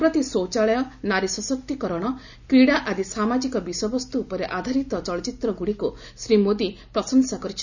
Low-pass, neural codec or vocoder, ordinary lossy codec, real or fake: 7.2 kHz; none; none; real